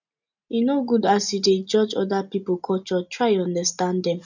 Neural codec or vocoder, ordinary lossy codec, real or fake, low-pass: none; none; real; 7.2 kHz